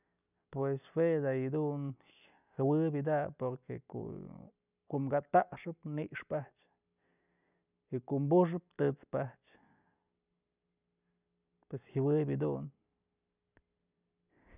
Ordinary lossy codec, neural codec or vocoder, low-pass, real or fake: none; none; 3.6 kHz; real